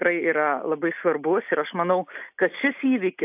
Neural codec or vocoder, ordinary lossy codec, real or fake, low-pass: none; AAC, 32 kbps; real; 3.6 kHz